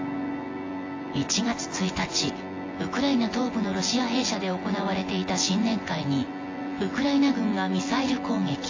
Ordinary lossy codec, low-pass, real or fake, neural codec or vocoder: AAC, 32 kbps; 7.2 kHz; fake; vocoder, 24 kHz, 100 mel bands, Vocos